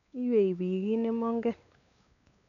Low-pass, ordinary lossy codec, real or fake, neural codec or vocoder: 7.2 kHz; none; fake; codec, 16 kHz, 4 kbps, X-Codec, WavLM features, trained on Multilingual LibriSpeech